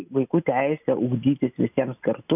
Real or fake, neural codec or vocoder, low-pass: real; none; 3.6 kHz